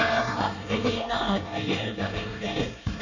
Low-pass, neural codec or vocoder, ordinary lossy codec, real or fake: 7.2 kHz; codec, 24 kHz, 1 kbps, SNAC; none; fake